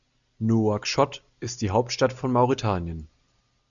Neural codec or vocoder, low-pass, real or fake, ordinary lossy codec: none; 7.2 kHz; real; MP3, 96 kbps